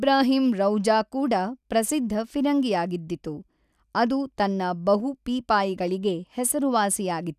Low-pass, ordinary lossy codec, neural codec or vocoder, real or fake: 14.4 kHz; none; none; real